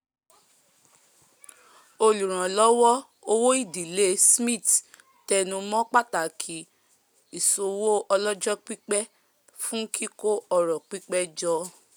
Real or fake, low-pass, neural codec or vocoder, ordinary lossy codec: real; none; none; none